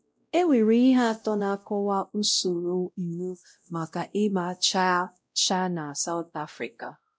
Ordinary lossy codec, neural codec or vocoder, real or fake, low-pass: none; codec, 16 kHz, 0.5 kbps, X-Codec, WavLM features, trained on Multilingual LibriSpeech; fake; none